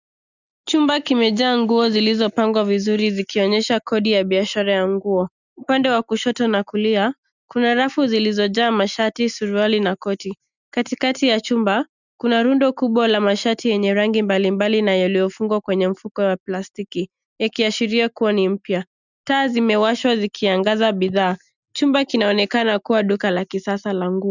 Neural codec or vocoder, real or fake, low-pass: none; real; 7.2 kHz